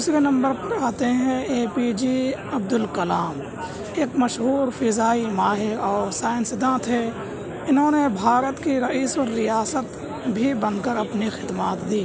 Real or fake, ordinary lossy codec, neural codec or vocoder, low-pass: real; none; none; none